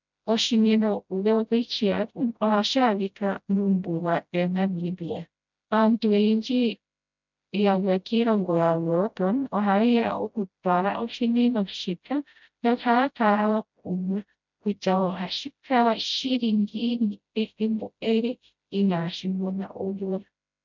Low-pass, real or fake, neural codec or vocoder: 7.2 kHz; fake; codec, 16 kHz, 0.5 kbps, FreqCodec, smaller model